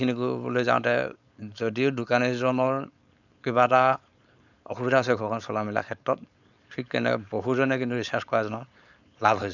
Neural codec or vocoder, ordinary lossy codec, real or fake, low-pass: codec, 16 kHz, 4.8 kbps, FACodec; none; fake; 7.2 kHz